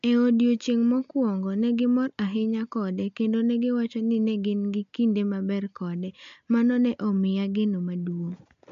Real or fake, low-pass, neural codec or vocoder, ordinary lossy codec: real; 7.2 kHz; none; AAC, 64 kbps